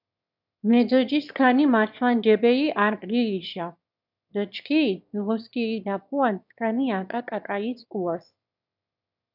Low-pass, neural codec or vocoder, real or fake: 5.4 kHz; autoencoder, 22.05 kHz, a latent of 192 numbers a frame, VITS, trained on one speaker; fake